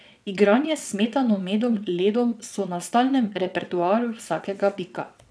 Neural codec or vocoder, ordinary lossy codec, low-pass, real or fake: vocoder, 22.05 kHz, 80 mel bands, WaveNeXt; none; none; fake